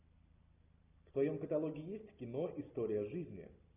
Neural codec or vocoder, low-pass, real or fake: none; 3.6 kHz; real